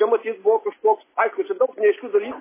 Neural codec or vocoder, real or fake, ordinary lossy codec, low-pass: none; real; MP3, 16 kbps; 3.6 kHz